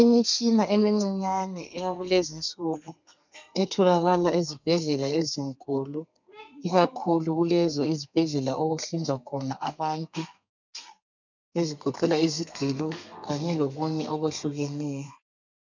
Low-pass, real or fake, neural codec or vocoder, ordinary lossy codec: 7.2 kHz; fake; codec, 32 kHz, 1.9 kbps, SNAC; MP3, 64 kbps